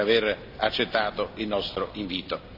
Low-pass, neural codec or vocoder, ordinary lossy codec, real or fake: 5.4 kHz; none; none; real